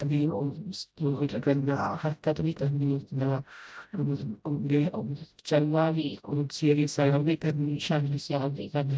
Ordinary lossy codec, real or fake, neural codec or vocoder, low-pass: none; fake; codec, 16 kHz, 0.5 kbps, FreqCodec, smaller model; none